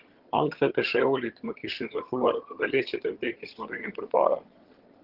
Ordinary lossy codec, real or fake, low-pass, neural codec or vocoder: Opus, 16 kbps; fake; 5.4 kHz; vocoder, 22.05 kHz, 80 mel bands, HiFi-GAN